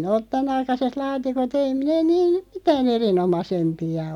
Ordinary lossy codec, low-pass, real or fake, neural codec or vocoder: none; 19.8 kHz; real; none